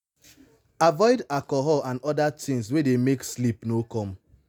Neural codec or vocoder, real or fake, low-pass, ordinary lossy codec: none; real; none; none